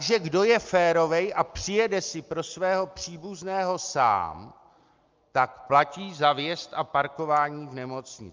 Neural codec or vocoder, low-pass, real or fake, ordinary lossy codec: none; 7.2 kHz; real; Opus, 24 kbps